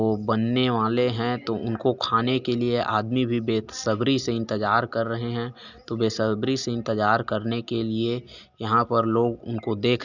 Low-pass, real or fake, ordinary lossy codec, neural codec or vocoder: 7.2 kHz; real; none; none